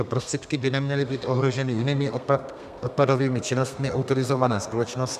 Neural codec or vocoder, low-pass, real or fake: codec, 32 kHz, 1.9 kbps, SNAC; 14.4 kHz; fake